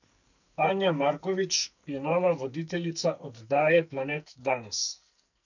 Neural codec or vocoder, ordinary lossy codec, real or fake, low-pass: codec, 44.1 kHz, 2.6 kbps, SNAC; none; fake; 7.2 kHz